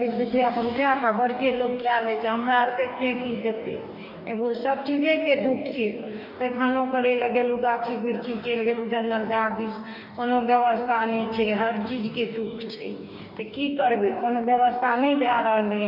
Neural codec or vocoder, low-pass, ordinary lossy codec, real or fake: codec, 44.1 kHz, 2.6 kbps, DAC; 5.4 kHz; none; fake